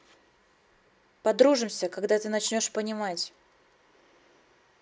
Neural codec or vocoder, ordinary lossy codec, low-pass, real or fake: none; none; none; real